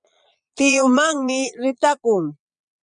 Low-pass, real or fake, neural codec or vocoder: 9.9 kHz; fake; vocoder, 22.05 kHz, 80 mel bands, Vocos